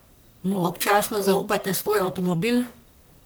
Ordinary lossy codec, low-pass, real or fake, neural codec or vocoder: none; none; fake; codec, 44.1 kHz, 1.7 kbps, Pupu-Codec